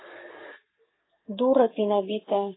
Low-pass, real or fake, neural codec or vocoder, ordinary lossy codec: 7.2 kHz; fake; codec, 44.1 kHz, 7.8 kbps, Pupu-Codec; AAC, 16 kbps